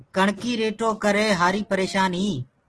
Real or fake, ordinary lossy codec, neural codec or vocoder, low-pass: real; Opus, 16 kbps; none; 10.8 kHz